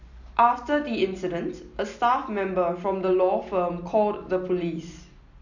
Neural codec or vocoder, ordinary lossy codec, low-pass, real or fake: none; none; 7.2 kHz; real